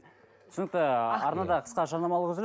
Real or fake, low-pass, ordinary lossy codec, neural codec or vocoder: real; none; none; none